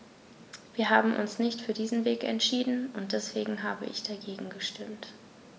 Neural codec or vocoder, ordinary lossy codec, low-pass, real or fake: none; none; none; real